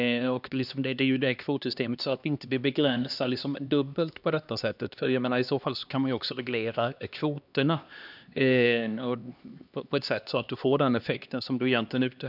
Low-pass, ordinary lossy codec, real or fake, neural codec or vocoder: 5.4 kHz; none; fake; codec, 16 kHz, 2 kbps, X-Codec, HuBERT features, trained on LibriSpeech